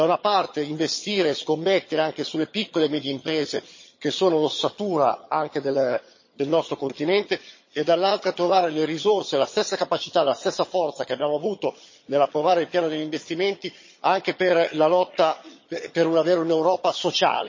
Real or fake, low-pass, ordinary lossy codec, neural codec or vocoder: fake; 7.2 kHz; MP3, 32 kbps; vocoder, 22.05 kHz, 80 mel bands, HiFi-GAN